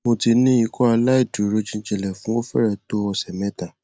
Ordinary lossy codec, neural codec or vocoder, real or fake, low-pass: none; none; real; none